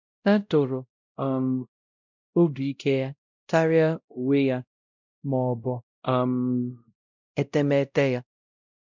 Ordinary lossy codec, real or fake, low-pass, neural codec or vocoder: none; fake; 7.2 kHz; codec, 16 kHz, 0.5 kbps, X-Codec, WavLM features, trained on Multilingual LibriSpeech